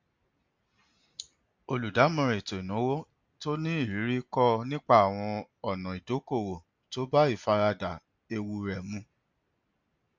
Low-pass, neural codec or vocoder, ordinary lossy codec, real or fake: 7.2 kHz; none; MP3, 48 kbps; real